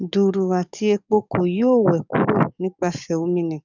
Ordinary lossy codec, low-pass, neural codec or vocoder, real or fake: none; 7.2 kHz; codec, 44.1 kHz, 7.8 kbps, DAC; fake